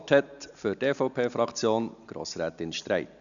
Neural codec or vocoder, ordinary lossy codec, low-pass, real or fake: none; MP3, 96 kbps; 7.2 kHz; real